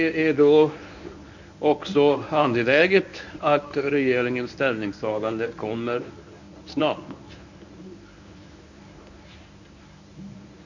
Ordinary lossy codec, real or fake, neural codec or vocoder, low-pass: none; fake; codec, 24 kHz, 0.9 kbps, WavTokenizer, medium speech release version 1; 7.2 kHz